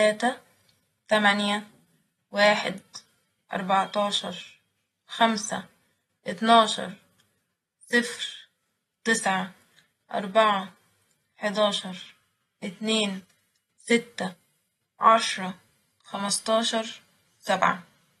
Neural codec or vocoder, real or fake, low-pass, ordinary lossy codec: none; real; 14.4 kHz; AAC, 32 kbps